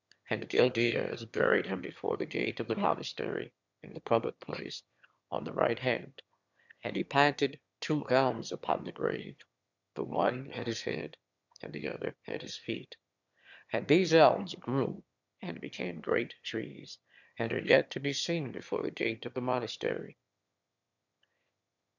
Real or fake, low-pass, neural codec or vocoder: fake; 7.2 kHz; autoencoder, 22.05 kHz, a latent of 192 numbers a frame, VITS, trained on one speaker